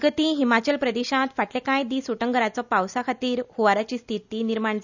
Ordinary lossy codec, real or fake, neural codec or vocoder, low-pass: none; real; none; 7.2 kHz